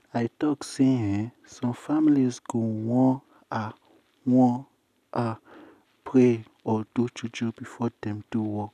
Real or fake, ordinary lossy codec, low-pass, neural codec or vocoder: real; none; 14.4 kHz; none